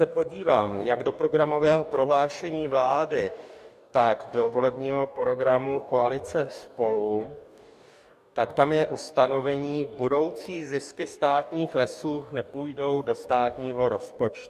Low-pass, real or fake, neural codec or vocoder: 14.4 kHz; fake; codec, 44.1 kHz, 2.6 kbps, DAC